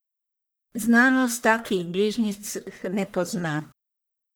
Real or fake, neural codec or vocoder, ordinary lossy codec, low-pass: fake; codec, 44.1 kHz, 1.7 kbps, Pupu-Codec; none; none